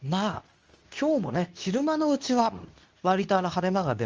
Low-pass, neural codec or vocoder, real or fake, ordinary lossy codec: 7.2 kHz; codec, 24 kHz, 0.9 kbps, WavTokenizer, medium speech release version 2; fake; Opus, 24 kbps